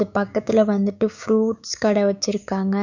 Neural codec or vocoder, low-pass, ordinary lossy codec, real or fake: codec, 16 kHz, 6 kbps, DAC; 7.2 kHz; none; fake